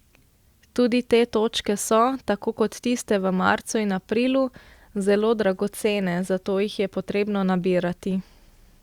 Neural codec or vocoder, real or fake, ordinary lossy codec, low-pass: none; real; Opus, 64 kbps; 19.8 kHz